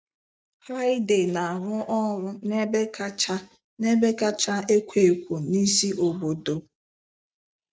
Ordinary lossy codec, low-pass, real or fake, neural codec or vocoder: none; none; real; none